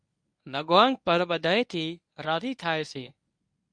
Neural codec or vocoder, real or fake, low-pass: codec, 24 kHz, 0.9 kbps, WavTokenizer, medium speech release version 1; fake; 9.9 kHz